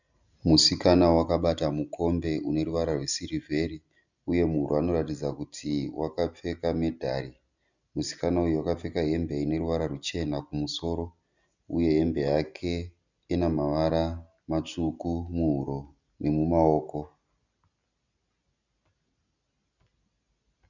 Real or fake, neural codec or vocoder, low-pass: real; none; 7.2 kHz